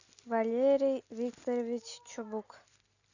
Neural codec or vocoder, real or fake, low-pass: none; real; 7.2 kHz